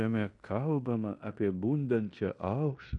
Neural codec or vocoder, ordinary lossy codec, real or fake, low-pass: codec, 16 kHz in and 24 kHz out, 0.9 kbps, LongCat-Audio-Codec, fine tuned four codebook decoder; MP3, 64 kbps; fake; 10.8 kHz